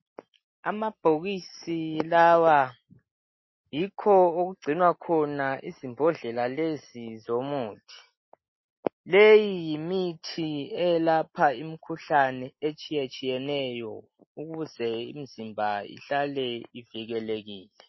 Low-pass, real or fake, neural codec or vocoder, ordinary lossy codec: 7.2 kHz; real; none; MP3, 24 kbps